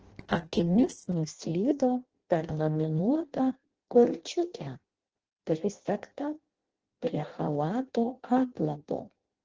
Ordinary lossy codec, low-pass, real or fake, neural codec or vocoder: Opus, 16 kbps; 7.2 kHz; fake; codec, 16 kHz in and 24 kHz out, 0.6 kbps, FireRedTTS-2 codec